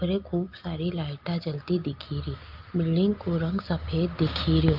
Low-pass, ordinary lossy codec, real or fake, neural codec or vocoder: 5.4 kHz; Opus, 32 kbps; real; none